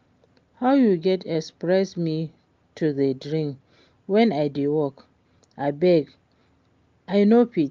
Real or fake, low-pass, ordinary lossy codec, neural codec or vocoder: real; 7.2 kHz; Opus, 32 kbps; none